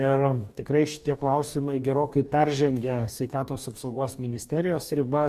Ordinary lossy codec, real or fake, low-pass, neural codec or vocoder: Opus, 64 kbps; fake; 14.4 kHz; codec, 44.1 kHz, 2.6 kbps, DAC